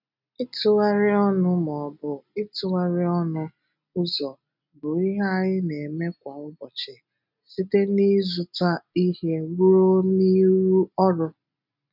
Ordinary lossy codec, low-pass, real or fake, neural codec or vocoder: none; 5.4 kHz; real; none